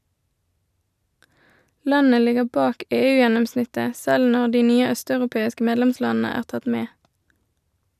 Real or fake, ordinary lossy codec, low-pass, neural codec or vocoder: real; none; 14.4 kHz; none